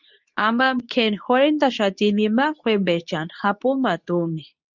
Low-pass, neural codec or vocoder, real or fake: 7.2 kHz; codec, 24 kHz, 0.9 kbps, WavTokenizer, medium speech release version 2; fake